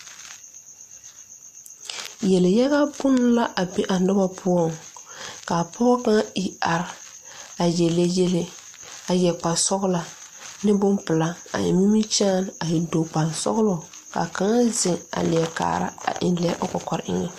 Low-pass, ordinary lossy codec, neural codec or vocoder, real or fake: 14.4 kHz; MP3, 64 kbps; none; real